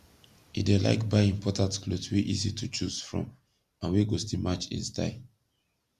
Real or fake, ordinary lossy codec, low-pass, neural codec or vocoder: real; Opus, 64 kbps; 14.4 kHz; none